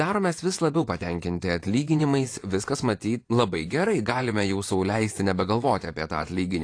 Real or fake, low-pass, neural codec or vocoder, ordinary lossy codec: fake; 9.9 kHz; vocoder, 24 kHz, 100 mel bands, Vocos; AAC, 48 kbps